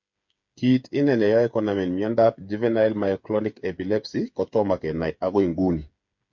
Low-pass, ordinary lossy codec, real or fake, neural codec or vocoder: 7.2 kHz; MP3, 48 kbps; fake; codec, 16 kHz, 8 kbps, FreqCodec, smaller model